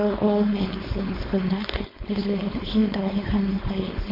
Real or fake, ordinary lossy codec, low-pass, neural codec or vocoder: fake; MP3, 32 kbps; 5.4 kHz; codec, 16 kHz, 4.8 kbps, FACodec